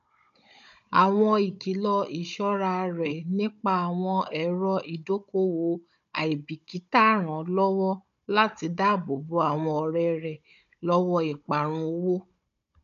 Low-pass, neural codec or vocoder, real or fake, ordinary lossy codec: 7.2 kHz; codec, 16 kHz, 16 kbps, FunCodec, trained on Chinese and English, 50 frames a second; fake; none